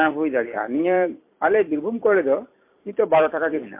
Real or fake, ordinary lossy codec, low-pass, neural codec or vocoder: fake; MP3, 24 kbps; 3.6 kHz; vocoder, 44.1 kHz, 128 mel bands every 256 samples, BigVGAN v2